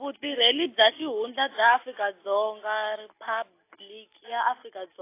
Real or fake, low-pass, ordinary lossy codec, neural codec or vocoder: real; 3.6 kHz; AAC, 24 kbps; none